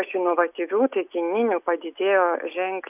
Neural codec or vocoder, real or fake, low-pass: none; real; 3.6 kHz